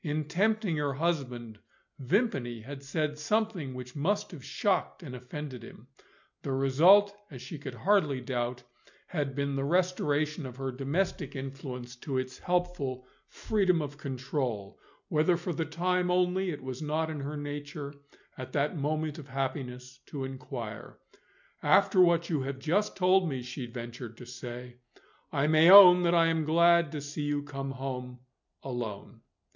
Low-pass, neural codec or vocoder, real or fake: 7.2 kHz; none; real